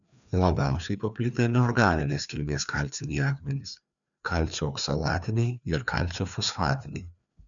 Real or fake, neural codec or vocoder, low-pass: fake; codec, 16 kHz, 2 kbps, FreqCodec, larger model; 7.2 kHz